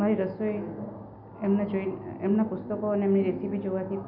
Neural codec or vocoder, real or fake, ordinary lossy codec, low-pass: none; real; none; 5.4 kHz